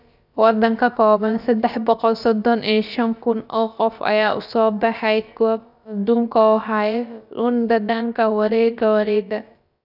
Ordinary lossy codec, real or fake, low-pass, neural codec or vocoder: none; fake; 5.4 kHz; codec, 16 kHz, about 1 kbps, DyCAST, with the encoder's durations